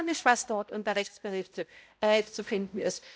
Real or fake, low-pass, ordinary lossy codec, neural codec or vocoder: fake; none; none; codec, 16 kHz, 0.5 kbps, X-Codec, HuBERT features, trained on balanced general audio